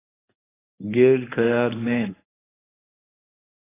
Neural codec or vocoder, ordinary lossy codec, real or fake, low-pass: codec, 24 kHz, 0.9 kbps, WavTokenizer, medium speech release version 1; AAC, 16 kbps; fake; 3.6 kHz